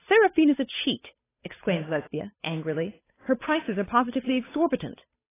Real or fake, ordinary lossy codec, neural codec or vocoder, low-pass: fake; AAC, 16 kbps; codec, 16 kHz, 8 kbps, FunCodec, trained on Chinese and English, 25 frames a second; 3.6 kHz